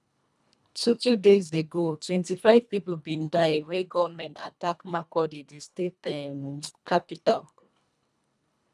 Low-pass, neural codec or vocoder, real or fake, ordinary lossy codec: none; codec, 24 kHz, 1.5 kbps, HILCodec; fake; none